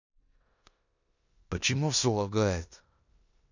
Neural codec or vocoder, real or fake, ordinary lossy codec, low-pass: codec, 16 kHz in and 24 kHz out, 0.9 kbps, LongCat-Audio-Codec, four codebook decoder; fake; none; 7.2 kHz